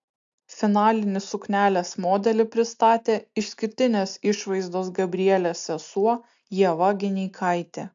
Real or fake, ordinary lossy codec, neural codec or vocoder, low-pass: real; AAC, 64 kbps; none; 7.2 kHz